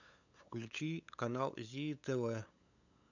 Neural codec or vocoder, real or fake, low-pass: codec, 16 kHz, 8 kbps, FunCodec, trained on LibriTTS, 25 frames a second; fake; 7.2 kHz